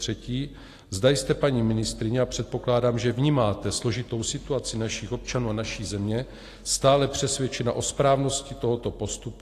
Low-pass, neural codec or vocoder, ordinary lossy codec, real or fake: 14.4 kHz; none; AAC, 48 kbps; real